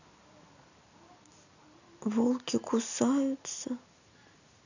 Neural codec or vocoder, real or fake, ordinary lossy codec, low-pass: none; real; none; 7.2 kHz